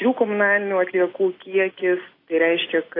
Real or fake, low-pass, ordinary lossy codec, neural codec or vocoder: real; 5.4 kHz; AAC, 24 kbps; none